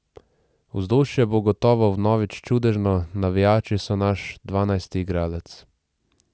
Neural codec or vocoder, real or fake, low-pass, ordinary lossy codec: none; real; none; none